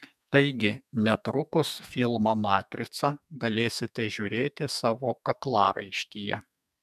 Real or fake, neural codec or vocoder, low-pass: fake; codec, 32 kHz, 1.9 kbps, SNAC; 14.4 kHz